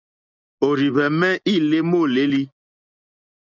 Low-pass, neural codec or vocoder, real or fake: 7.2 kHz; none; real